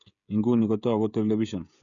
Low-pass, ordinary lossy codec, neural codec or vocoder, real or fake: 7.2 kHz; none; codec, 16 kHz, 16 kbps, FreqCodec, smaller model; fake